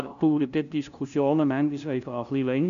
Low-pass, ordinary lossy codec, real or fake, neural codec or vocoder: 7.2 kHz; none; fake; codec, 16 kHz, 0.5 kbps, FunCodec, trained on LibriTTS, 25 frames a second